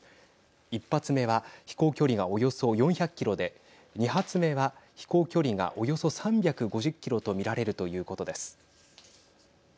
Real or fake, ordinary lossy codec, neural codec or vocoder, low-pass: real; none; none; none